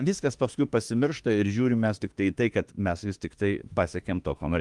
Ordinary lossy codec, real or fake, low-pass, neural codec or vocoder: Opus, 24 kbps; fake; 10.8 kHz; autoencoder, 48 kHz, 32 numbers a frame, DAC-VAE, trained on Japanese speech